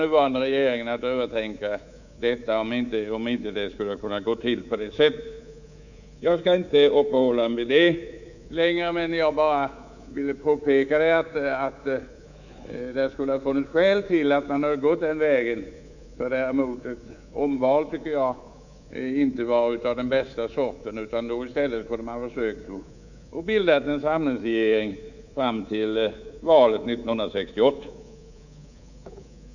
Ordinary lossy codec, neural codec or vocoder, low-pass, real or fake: none; codec, 24 kHz, 3.1 kbps, DualCodec; 7.2 kHz; fake